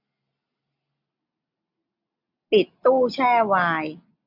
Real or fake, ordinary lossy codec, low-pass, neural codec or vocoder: real; none; 5.4 kHz; none